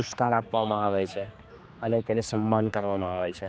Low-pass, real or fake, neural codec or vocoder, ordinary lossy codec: none; fake; codec, 16 kHz, 2 kbps, X-Codec, HuBERT features, trained on general audio; none